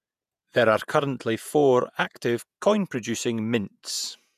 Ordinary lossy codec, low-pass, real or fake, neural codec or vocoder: none; 14.4 kHz; fake; vocoder, 48 kHz, 128 mel bands, Vocos